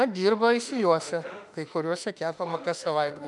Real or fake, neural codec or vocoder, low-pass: fake; autoencoder, 48 kHz, 32 numbers a frame, DAC-VAE, trained on Japanese speech; 10.8 kHz